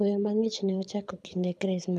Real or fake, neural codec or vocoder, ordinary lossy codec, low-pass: fake; codec, 24 kHz, 6 kbps, HILCodec; none; none